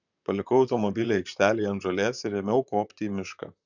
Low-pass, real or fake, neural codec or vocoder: 7.2 kHz; fake; vocoder, 44.1 kHz, 128 mel bands, Pupu-Vocoder